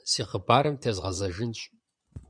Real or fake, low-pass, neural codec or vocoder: fake; 9.9 kHz; vocoder, 22.05 kHz, 80 mel bands, Vocos